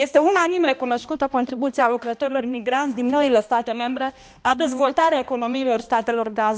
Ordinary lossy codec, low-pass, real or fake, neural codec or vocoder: none; none; fake; codec, 16 kHz, 1 kbps, X-Codec, HuBERT features, trained on balanced general audio